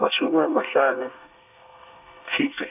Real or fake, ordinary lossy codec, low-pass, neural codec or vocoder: fake; none; 3.6 kHz; codec, 24 kHz, 1 kbps, SNAC